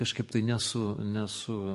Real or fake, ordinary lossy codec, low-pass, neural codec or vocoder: fake; MP3, 48 kbps; 14.4 kHz; codec, 44.1 kHz, 7.8 kbps, DAC